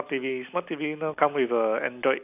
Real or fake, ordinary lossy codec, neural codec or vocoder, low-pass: real; none; none; 3.6 kHz